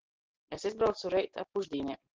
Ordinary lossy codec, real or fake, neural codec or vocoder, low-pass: Opus, 16 kbps; real; none; 7.2 kHz